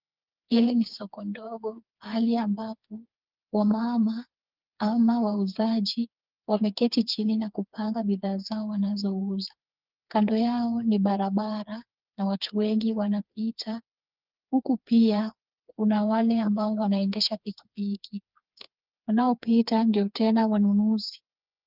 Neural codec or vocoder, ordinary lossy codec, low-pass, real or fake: codec, 16 kHz, 4 kbps, FreqCodec, smaller model; Opus, 24 kbps; 5.4 kHz; fake